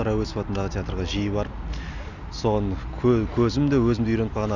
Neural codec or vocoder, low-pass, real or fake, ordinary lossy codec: none; 7.2 kHz; real; none